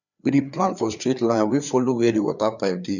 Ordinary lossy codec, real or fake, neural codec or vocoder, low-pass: none; fake; codec, 16 kHz, 4 kbps, FreqCodec, larger model; 7.2 kHz